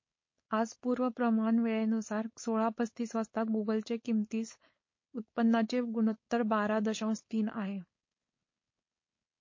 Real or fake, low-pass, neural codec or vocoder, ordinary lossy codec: fake; 7.2 kHz; codec, 16 kHz, 4.8 kbps, FACodec; MP3, 32 kbps